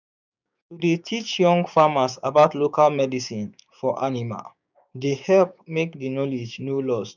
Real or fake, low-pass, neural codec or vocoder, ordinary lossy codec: fake; 7.2 kHz; codec, 16 kHz, 6 kbps, DAC; none